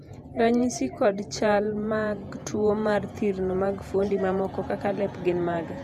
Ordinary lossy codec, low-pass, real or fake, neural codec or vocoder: none; 14.4 kHz; fake; vocoder, 48 kHz, 128 mel bands, Vocos